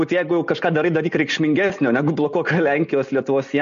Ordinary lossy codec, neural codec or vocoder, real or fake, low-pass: MP3, 48 kbps; none; real; 7.2 kHz